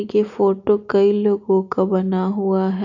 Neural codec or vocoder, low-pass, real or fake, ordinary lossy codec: none; 7.2 kHz; real; none